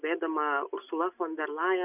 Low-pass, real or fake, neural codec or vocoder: 3.6 kHz; real; none